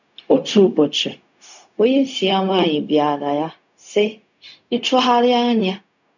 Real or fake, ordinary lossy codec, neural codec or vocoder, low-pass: fake; none; codec, 16 kHz, 0.4 kbps, LongCat-Audio-Codec; 7.2 kHz